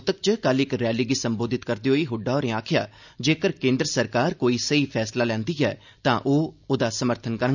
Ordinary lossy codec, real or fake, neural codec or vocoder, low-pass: none; real; none; 7.2 kHz